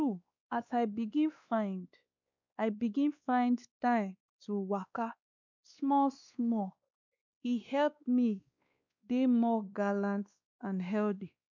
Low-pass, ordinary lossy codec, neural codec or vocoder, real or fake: 7.2 kHz; none; codec, 16 kHz, 2 kbps, X-Codec, WavLM features, trained on Multilingual LibriSpeech; fake